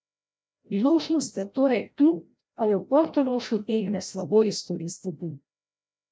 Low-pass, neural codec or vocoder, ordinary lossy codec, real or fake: none; codec, 16 kHz, 0.5 kbps, FreqCodec, larger model; none; fake